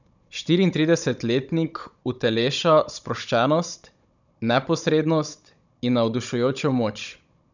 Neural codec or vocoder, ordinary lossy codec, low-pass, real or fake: codec, 16 kHz, 16 kbps, FunCodec, trained on Chinese and English, 50 frames a second; none; 7.2 kHz; fake